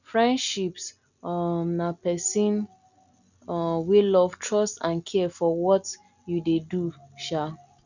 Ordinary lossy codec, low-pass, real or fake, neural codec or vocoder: none; 7.2 kHz; real; none